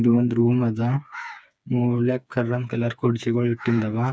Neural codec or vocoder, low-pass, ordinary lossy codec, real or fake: codec, 16 kHz, 4 kbps, FreqCodec, smaller model; none; none; fake